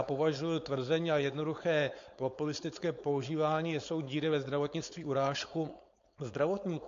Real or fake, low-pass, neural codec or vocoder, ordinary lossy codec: fake; 7.2 kHz; codec, 16 kHz, 4.8 kbps, FACodec; AAC, 48 kbps